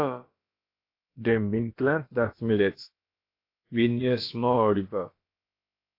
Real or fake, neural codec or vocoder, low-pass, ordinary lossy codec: fake; codec, 16 kHz, about 1 kbps, DyCAST, with the encoder's durations; 5.4 kHz; AAC, 32 kbps